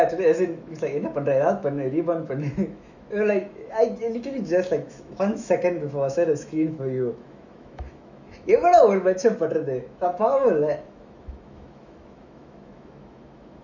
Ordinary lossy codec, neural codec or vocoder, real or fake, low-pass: none; autoencoder, 48 kHz, 128 numbers a frame, DAC-VAE, trained on Japanese speech; fake; 7.2 kHz